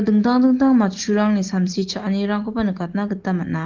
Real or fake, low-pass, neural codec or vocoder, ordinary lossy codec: real; 7.2 kHz; none; Opus, 16 kbps